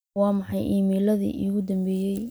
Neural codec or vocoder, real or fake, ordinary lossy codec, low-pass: none; real; none; none